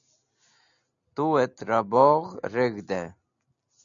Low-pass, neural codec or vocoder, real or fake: 7.2 kHz; none; real